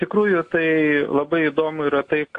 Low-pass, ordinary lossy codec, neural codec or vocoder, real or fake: 9.9 kHz; AAC, 48 kbps; none; real